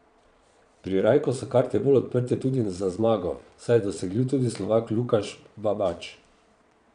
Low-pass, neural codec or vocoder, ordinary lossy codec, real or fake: 9.9 kHz; vocoder, 22.05 kHz, 80 mel bands, Vocos; none; fake